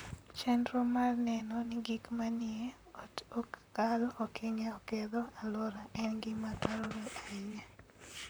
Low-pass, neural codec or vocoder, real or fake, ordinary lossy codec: none; vocoder, 44.1 kHz, 128 mel bands, Pupu-Vocoder; fake; none